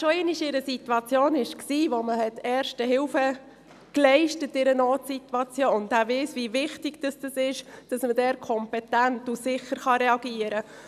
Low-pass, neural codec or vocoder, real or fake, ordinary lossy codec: 14.4 kHz; none; real; none